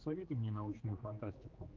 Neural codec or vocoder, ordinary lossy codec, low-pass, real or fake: codec, 16 kHz, 2 kbps, X-Codec, HuBERT features, trained on general audio; Opus, 32 kbps; 7.2 kHz; fake